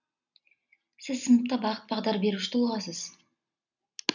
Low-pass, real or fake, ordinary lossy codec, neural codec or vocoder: 7.2 kHz; real; none; none